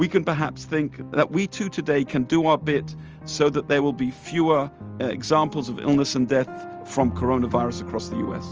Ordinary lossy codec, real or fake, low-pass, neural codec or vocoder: Opus, 24 kbps; real; 7.2 kHz; none